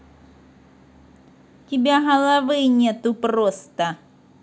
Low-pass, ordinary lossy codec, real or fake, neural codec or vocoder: none; none; real; none